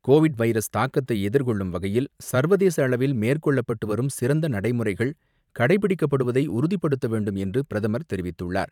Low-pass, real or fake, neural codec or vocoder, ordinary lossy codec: 19.8 kHz; fake; vocoder, 44.1 kHz, 128 mel bands every 512 samples, BigVGAN v2; none